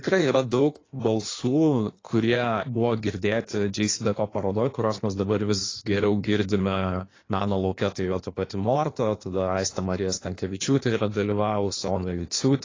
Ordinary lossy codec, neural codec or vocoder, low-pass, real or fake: AAC, 32 kbps; codec, 16 kHz in and 24 kHz out, 1.1 kbps, FireRedTTS-2 codec; 7.2 kHz; fake